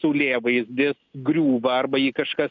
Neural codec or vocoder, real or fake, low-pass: none; real; 7.2 kHz